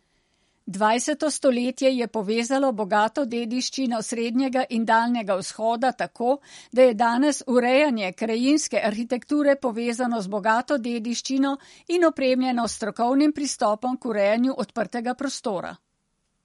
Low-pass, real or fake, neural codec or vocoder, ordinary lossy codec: 19.8 kHz; real; none; MP3, 48 kbps